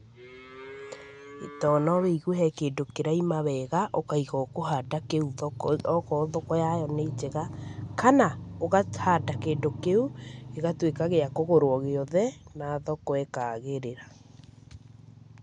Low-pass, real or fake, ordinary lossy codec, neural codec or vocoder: 9.9 kHz; real; none; none